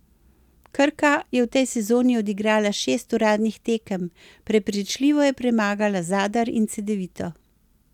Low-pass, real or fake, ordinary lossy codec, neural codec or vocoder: 19.8 kHz; real; none; none